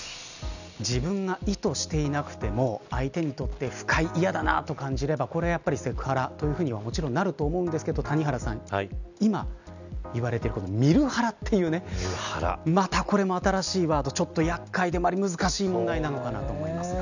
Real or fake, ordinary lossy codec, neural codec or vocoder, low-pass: real; none; none; 7.2 kHz